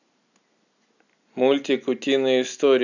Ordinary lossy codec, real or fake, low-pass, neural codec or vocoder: none; real; 7.2 kHz; none